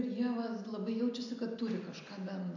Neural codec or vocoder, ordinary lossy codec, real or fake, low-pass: none; AAC, 48 kbps; real; 7.2 kHz